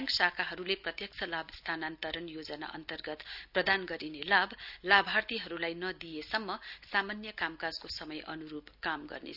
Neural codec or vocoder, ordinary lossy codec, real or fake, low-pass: none; none; real; 5.4 kHz